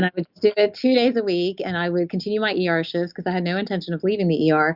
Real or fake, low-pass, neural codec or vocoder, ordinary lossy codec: real; 5.4 kHz; none; Opus, 64 kbps